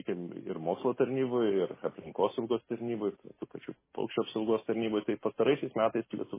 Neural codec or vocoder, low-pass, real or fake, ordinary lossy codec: none; 3.6 kHz; real; MP3, 16 kbps